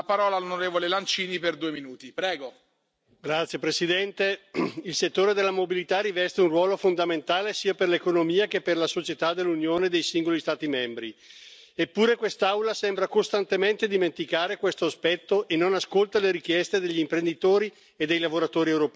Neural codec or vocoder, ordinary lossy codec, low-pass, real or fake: none; none; none; real